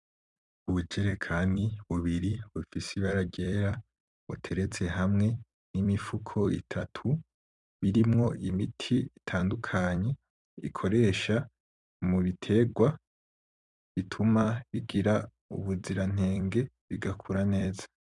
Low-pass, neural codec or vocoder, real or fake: 10.8 kHz; vocoder, 44.1 kHz, 128 mel bands every 256 samples, BigVGAN v2; fake